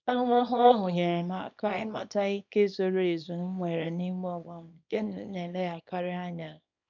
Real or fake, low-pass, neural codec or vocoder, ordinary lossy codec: fake; 7.2 kHz; codec, 24 kHz, 0.9 kbps, WavTokenizer, small release; none